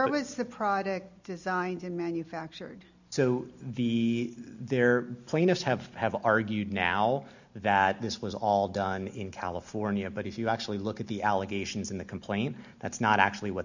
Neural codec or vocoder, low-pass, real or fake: none; 7.2 kHz; real